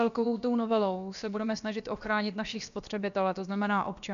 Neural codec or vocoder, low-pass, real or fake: codec, 16 kHz, about 1 kbps, DyCAST, with the encoder's durations; 7.2 kHz; fake